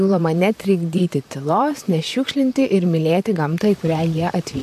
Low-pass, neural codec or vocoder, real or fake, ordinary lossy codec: 14.4 kHz; vocoder, 44.1 kHz, 128 mel bands, Pupu-Vocoder; fake; AAC, 96 kbps